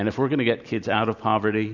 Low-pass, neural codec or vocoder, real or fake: 7.2 kHz; none; real